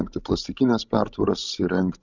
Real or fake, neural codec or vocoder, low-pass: fake; codec, 16 kHz, 16 kbps, FreqCodec, larger model; 7.2 kHz